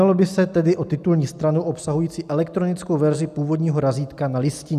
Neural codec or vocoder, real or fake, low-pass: none; real; 14.4 kHz